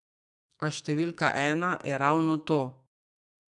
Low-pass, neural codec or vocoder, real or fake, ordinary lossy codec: 10.8 kHz; codec, 44.1 kHz, 2.6 kbps, SNAC; fake; none